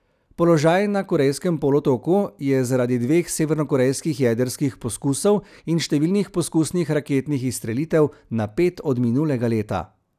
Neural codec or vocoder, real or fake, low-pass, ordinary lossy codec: none; real; 14.4 kHz; none